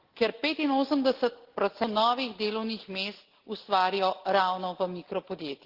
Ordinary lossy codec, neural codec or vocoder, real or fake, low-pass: Opus, 16 kbps; none; real; 5.4 kHz